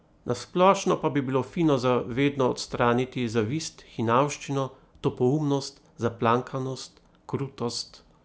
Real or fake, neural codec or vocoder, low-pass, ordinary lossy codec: real; none; none; none